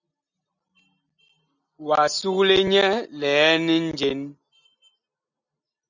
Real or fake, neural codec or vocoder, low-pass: real; none; 7.2 kHz